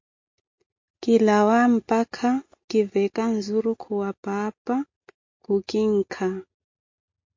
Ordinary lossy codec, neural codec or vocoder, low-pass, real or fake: MP3, 48 kbps; none; 7.2 kHz; real